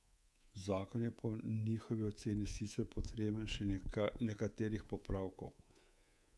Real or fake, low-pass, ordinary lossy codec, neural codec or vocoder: fake; none; none; codec, 24 kHz, 3.1 kbps, DualCodec